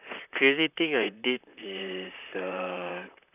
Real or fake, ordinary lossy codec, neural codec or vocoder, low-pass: fake; none; vocoder, 44.1 kHz, 128 mel bands, Pupu-Vocoder; 3.6 kHz